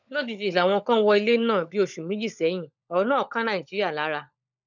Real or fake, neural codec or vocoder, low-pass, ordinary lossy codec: fake; codec, 16 kHz, 16 kbps, FunCodec, trained on LibriTTS, 50 frames a second; 7.2 kHz; none